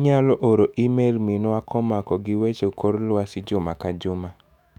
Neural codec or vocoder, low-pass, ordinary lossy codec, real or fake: autoencoder, 48 kHz, 128 numbers a frame, DAC-VAE, trained on Japanese speech; 19.8 kHz; none; fake